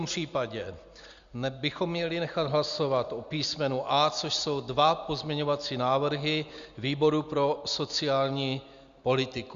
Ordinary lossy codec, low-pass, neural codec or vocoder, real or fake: Opus, 64 kbps; 7.2 kHz; none; real